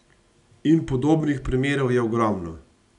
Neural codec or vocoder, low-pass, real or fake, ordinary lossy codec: none; 10.8 kHz; real; none